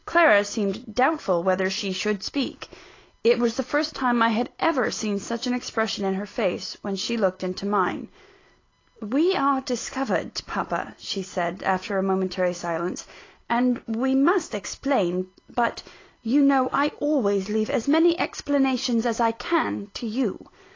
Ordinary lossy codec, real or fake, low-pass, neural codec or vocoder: AAC, 32 kbps; real; 7.2 kHz; none